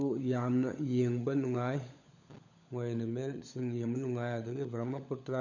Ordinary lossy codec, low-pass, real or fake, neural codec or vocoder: none; 7.2 kHz; fake; codec, 16 kHz, 16 kbps, FunCodec, trained on LibriTTS, 50 frames a second